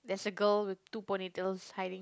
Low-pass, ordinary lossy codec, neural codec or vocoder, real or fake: none; none; none; real